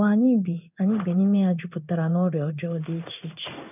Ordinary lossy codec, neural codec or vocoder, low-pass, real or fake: none; codec, 16 kHz in and 24 kHz out, 1 kbps, XY-Tokenizer; 3.6 kHz; fake